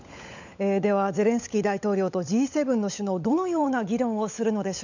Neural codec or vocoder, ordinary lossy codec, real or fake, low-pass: codec, 16 kHz, 16 kbps, FunCodec, trained on LibriTTS, 50 frames a second; none; fake; 7.2 kHz